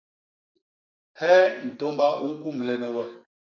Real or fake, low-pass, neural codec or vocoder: fake; 7.2 kHz; codec, 44.1 kHz, 2.6 kbps, SNAC